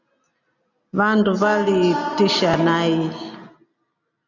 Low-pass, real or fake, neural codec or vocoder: 7.2 kHz; real; none